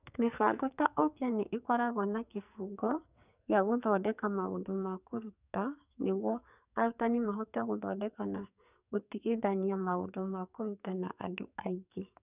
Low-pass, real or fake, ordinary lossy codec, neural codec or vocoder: 3.6 kHz; fake; none; codec, 44.1 kHz, 2.6 kbps, SNAC